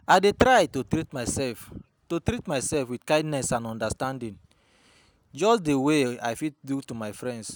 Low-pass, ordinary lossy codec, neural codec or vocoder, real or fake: none; none; none; real